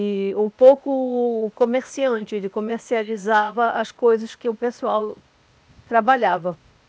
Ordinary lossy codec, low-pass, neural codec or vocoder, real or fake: none; none; codec, 16 kHz, 0.8 kbps, ZipCodec; fake